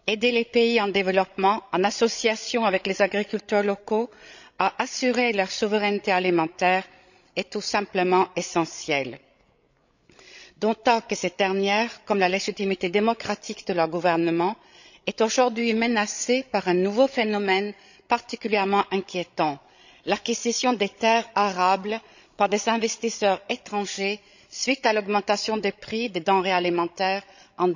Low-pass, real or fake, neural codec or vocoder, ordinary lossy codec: 7.2 kHz; fake; codec, 16 kHz, 16 kbps, FreqCodec, larger model; none